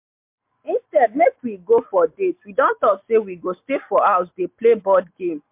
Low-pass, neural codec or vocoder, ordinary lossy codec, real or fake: 3.6 kHz; none; MP3, 32 kbps; real